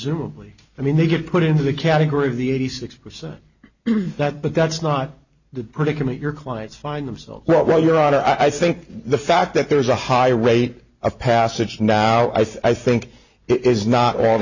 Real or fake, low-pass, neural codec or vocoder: real; 7.2 kHz; none